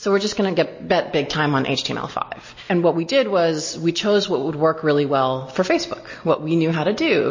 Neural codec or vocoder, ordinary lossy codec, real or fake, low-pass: none; MP3, 32 kbps; real; 7.2 kHz